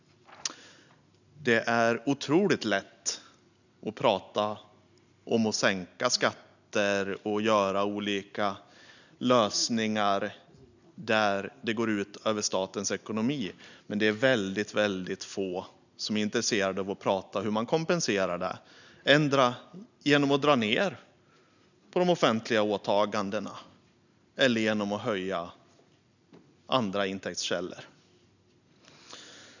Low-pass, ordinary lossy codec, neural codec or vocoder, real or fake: 7.2 kHz; none; none; real